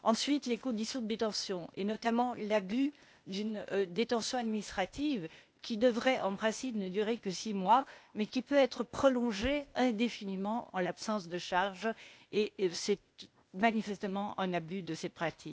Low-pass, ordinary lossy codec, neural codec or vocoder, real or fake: none; none; codec, 16 kHz, 0.8 kbps, ZipCodec; fake